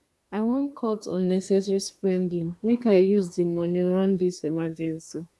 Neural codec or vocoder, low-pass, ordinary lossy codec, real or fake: codec, 24 kHz, 1 kbps, SNAC; none; none; fake